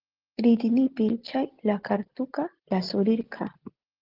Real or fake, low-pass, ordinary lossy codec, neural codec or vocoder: real; 5.4 kHz; Opus, 16 kbps; none